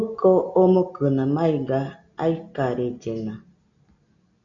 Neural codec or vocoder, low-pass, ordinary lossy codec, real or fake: none; 7.2 kHz; MP3, 96 kbps; real